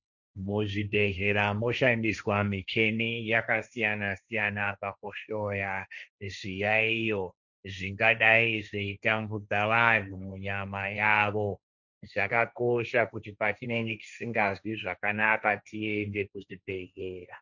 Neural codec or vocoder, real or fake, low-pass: codec, 16 kHz, 1.1 kbps, Voila-Tokenizer; fake; 7.2 kHz